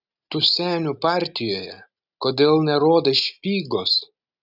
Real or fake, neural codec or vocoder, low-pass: real; none; 5.4 kHz